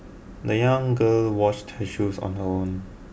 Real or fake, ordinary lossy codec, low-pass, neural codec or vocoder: real; none; none; none